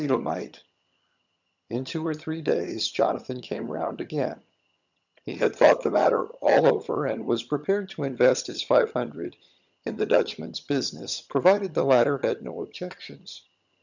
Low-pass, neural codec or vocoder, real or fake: 7.2 kHz; vocoder, 22.05 kHz, 80 mel bands, HiFi-GAN; fake